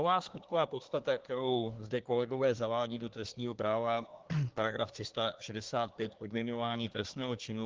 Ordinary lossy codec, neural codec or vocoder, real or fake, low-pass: Opus, 16 kbps; codec, 24 kHz, 1 kbps, SNAC; fake; 7.2 kHz